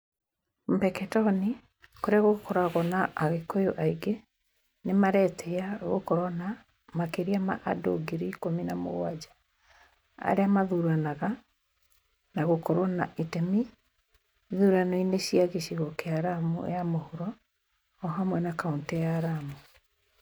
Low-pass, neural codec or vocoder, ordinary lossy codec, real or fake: none; none; none; real